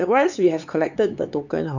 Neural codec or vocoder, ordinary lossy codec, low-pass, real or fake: codec, 16 kHz, 4 kbps, X-Codec, HuBERT features, trained on LibriSpeech; none; 7.2 kHz; fake